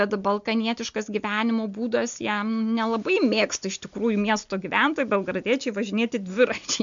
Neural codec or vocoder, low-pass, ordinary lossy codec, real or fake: none; 7.2 kHz; MP3, 64 kbps; real